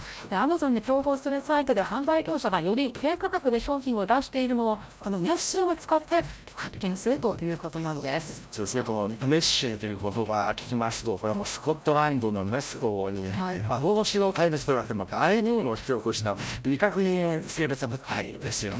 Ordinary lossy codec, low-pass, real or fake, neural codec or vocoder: none; none; fake; codec, 16 kHz, 0.5 kbps, FreqCodec, larger model